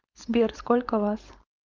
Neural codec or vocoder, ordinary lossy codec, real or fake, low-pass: codec, 16 kHz, 4.8 kbps, FACodec; Opus, 32 kbps; fake; 7.2 kHz